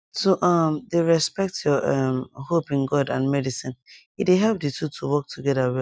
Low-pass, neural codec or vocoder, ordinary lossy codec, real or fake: none; none; none; real